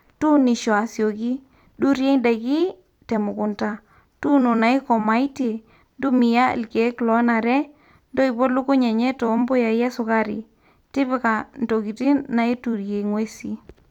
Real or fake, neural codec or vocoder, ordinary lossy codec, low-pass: fake; vocoder, 44.1 kHz, 128 mel bands every 256 samples, BigVGAN v2; none; 19.8 kHz